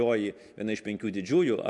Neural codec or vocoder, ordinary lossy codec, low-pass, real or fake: none; MP3, 96 kbps; 10.8 kHz; real